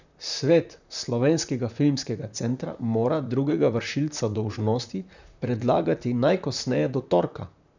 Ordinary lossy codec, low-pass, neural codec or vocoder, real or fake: none; 7.2 kHz; vocoder, 44.1 kHz, 128 mel bands, Pupu-Vocoder; fake